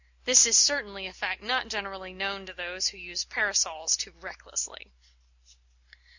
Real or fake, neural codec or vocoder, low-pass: real; none; 7.2 kHz